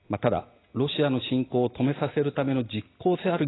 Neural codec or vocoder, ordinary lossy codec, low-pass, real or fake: none; AAC, 16 kbps; 7.2 kHz; real